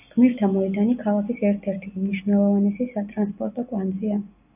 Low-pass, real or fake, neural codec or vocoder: 3.6 kHz; real; none